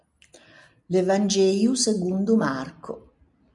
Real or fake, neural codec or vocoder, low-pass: real; none; 10.8 kHz